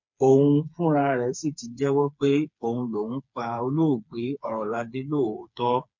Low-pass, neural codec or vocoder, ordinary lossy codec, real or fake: 7.2 kHz; codec, 16 kHz, 4 kbps, FreqCodec, smaller model; MP3, 48 kbps; fake